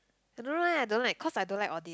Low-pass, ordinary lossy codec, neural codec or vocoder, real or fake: none; none; none; real